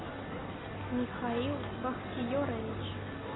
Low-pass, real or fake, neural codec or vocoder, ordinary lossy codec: 7.2 kHz; real; none; AAC, 16 kbps